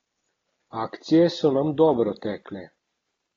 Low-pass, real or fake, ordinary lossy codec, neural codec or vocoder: 7.2 kHz; real; AAC, 24 kbps; none